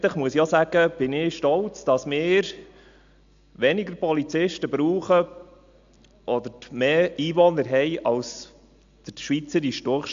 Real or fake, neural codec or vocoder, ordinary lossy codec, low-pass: real; none; AAC, 64 kbps; 7.2 kHz